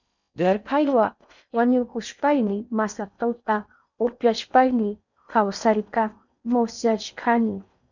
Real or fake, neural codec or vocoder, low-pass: fake; codec, 16 kHz in and 24 kHz out, 0.6 kbps, FocalCodec, streaming, 4096 codes; 7.2 kHz